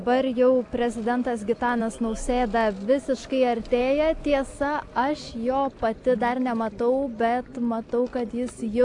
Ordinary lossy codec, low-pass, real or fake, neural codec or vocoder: AAC, 64 kbps; 10.8 kHz; real; none